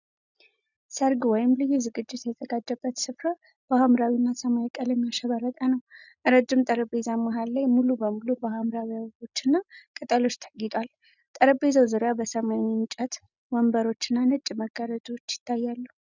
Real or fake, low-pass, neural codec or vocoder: real; 7.2 kHz; none